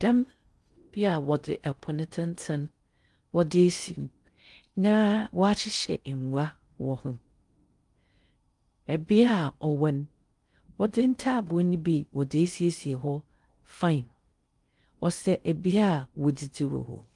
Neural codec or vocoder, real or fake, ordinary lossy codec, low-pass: codec, 16 kHz in and 24 kHz out, 0.6 kbps, FocalCodec, streaming, 4096 codes; fake; Opus, 32 kbps; 10.8 kHz